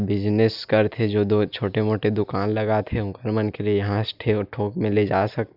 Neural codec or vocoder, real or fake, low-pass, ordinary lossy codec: none; real; 5.4 kHz; none